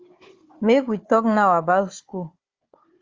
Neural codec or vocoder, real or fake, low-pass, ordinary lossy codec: codec, 16 kHz, 4 kbps, FunCodec, trained on Chinese and English, 50 frames a second; fake; 7.2 kHz; Opus, 32 kbps